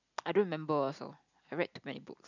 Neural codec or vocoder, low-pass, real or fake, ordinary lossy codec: none; 7.2 kHz; real; none